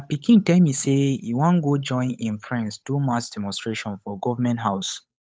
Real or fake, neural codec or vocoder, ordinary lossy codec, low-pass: fake; codec, 16 kHz, 8 kbps, FunCodec, trained on Chinese and English, 25 frames a second; none; none